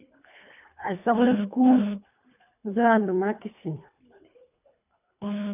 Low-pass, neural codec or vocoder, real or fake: 3.6 kHz; codec, 24 kHz, 3 kbps, HILCodec; fake